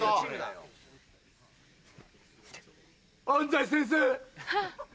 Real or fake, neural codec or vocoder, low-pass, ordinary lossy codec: real; none; none; none